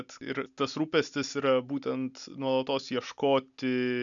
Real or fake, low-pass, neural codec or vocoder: real; 7.2 kHz; none